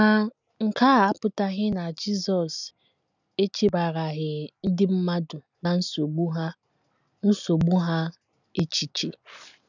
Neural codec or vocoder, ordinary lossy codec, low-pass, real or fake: none; none; 7.2 kHz; real